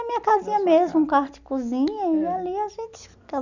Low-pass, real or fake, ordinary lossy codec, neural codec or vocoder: 7.2 kHz; real; none; none